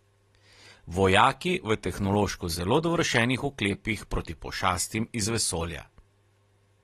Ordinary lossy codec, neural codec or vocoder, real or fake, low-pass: AAC, 32 kbps; none; real; 19.8 kHz